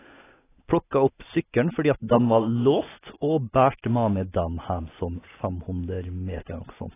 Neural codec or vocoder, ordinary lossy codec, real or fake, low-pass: none; AAC, 16 kbps; real; 3.6 kHz